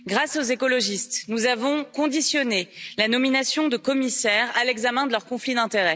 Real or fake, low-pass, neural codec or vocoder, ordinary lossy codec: real; none; none; none